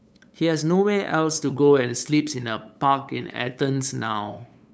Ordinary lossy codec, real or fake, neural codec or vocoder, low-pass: none; fake; codec, 16 kHz, 8 kbps, FunCodec, trained on LibriTTS, 25 frames a second; none